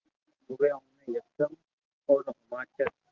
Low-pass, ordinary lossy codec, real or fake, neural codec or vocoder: 7.2 kHz; Opus, 16 kbps; real; none